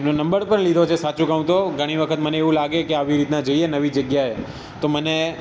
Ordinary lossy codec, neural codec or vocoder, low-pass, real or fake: none; none; none; real